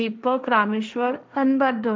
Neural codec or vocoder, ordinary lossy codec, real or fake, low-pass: codec, 16 kHz, 1.1 kbps, Voila-Tokenizer; none; fake; none